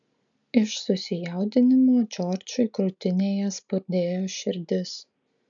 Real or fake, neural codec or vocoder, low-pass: real; none; 7.2 kHz